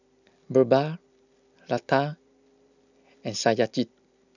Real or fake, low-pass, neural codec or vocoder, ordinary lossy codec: real; 7.2 kHz; none; none